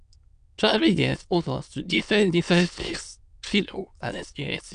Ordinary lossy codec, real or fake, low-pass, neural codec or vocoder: none; fake; 9.9 kHz; autoencoder, 22.05 kHz, a latent of 192 numbers a frame, VITS, trained on many speakers